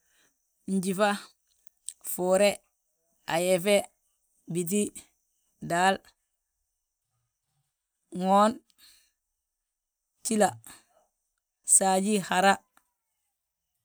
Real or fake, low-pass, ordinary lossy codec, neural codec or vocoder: real; none; none; none